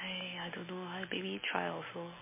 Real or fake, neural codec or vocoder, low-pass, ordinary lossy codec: real; none; 3.6 kHz; MP3, 16 kbps